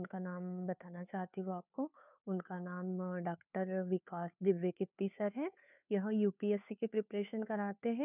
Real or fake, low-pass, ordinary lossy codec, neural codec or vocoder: fake; 3.6 kHz; none; codec, 16 kHz, 2 kbps, FunCodec, trained on Chinese and English, 25 frames a second